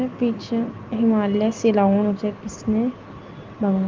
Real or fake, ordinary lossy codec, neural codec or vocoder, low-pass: real; Opus, 32 kbps; none; 7.2 kHz